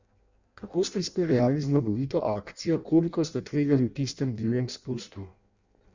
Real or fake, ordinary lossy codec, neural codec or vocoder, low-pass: fake; Opus, 64 kbps; codec, 16 kHz in and 24 kHz out, 0.6 kbps, FireRedTTS-2 codec; 7.2 kHz